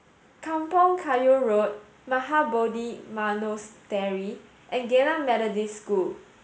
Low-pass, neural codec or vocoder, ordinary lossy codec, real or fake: none; none; none; real